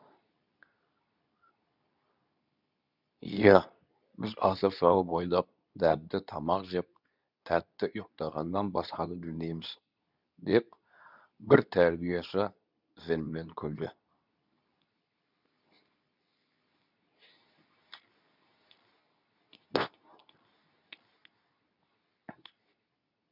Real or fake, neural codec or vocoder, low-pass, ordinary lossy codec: fake; codec, 24 kHz, 0.9 kbps, WavTokenizer, medium speech release version 2; 5.4 kHz; none